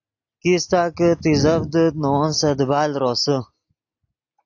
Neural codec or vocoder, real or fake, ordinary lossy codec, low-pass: none; real; AAC, 48 kbps; 7.2 kHz